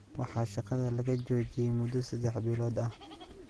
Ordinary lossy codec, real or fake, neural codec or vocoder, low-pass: Opus, 16 kbps; real; none; 10.8 kHz